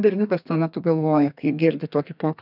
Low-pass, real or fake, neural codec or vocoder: 5.4 kHz; fake; codec, 32 kHz, 1.9 kbps, SNAC